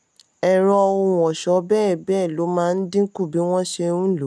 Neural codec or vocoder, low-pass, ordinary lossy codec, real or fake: none; none; none; real